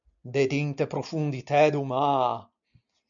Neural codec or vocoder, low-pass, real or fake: none; 7.2 kHz; real